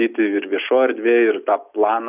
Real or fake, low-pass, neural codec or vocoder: real; 3.6 kHz; none